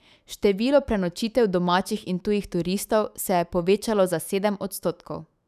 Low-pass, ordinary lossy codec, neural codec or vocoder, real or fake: 14.4 kHz; none; autoencoder, 48 kHz, 128 numbers a frame, DAC-VAE, trained on Japanese speech; fake